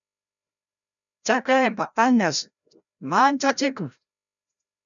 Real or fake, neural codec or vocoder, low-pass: fake; codec, 16 kHz, 0.5 kbps, FreqCodec, larger model; 7.2 kHz